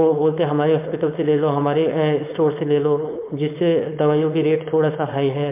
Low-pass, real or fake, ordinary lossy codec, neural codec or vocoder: 3.6 kHz; fake; MP3, 32 kbps; codec, 16 kHz, 4.8 kbps, FACodec